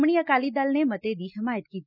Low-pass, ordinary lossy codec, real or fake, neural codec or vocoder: 5.4 kHz; none; real; none